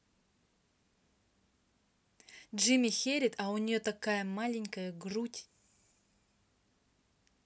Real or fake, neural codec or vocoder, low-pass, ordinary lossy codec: real; none; none; none